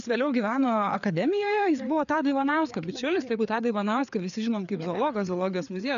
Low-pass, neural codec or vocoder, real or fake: 7.2 kHz; codec, 16 kHz, 4 kbps, FreqCodec, larger model; fake